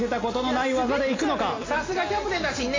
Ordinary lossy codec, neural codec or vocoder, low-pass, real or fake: AAC, 32 kbps; none; 7.2 kHz; real